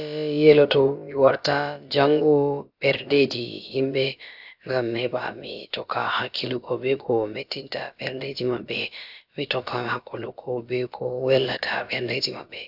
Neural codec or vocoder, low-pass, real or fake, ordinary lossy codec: codec, 16 kHz, about 1 kbps, DyCAST, with the encoder's durations; 5.4 kHz; fake; AAC, 48 kbps